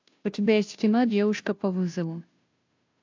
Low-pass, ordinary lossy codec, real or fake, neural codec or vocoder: 7.2 kHz; AAC, 48 kbps; fake; codec, 16 kHz, 0.5 kbps, FunCodec, trained on Chinese and English, 25 frames a second